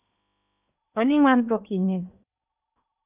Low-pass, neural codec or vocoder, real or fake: 3.6 kHz; codec, 16 kHz in and 24 kHz out, 0.8 kbps, FocalCodec, streaming, 65536 codes; fake